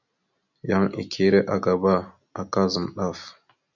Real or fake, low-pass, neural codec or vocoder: real; 7.2 kHz; none